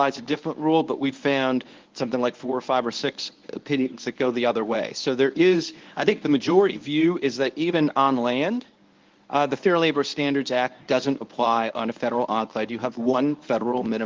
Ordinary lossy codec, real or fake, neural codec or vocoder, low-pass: Opus, 32 kbps; fake; codec, 24 kHz, 0.9 kbps, WavTokenizer, medium speech release version 1; 7.2 kHz